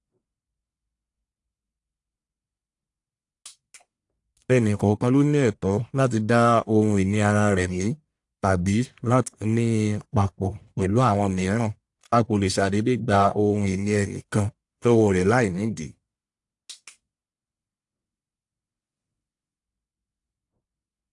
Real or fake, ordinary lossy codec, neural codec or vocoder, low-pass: fake; AAC, 64 kbps; codec, 44.1 kHz, 1.7 kbps, Pupu-Codec; 10.8 kHz